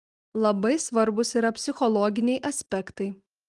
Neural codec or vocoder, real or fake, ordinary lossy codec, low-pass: none; real; Opus, 24 kbps; 10.8 kHz